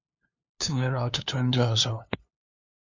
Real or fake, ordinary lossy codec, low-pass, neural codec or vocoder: fake; MP3, 64 kbps; 7.2 kHz; codec, 16 kHz, 2 kbps, FunCodec, trained on LibriTTS, 25 frames a second